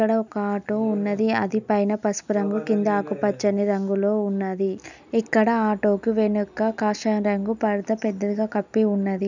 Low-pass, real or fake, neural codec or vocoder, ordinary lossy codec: 7.2 kHz; real; none; none